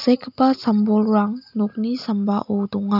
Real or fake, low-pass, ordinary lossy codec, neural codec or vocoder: real; 5.4 kHz; none; none